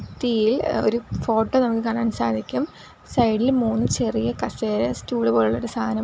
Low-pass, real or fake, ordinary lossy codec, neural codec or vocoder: none; real; none; none